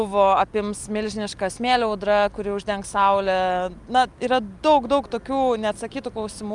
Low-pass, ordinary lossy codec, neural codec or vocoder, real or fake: 10.8 kHz; Opus, 32 kbps; none; real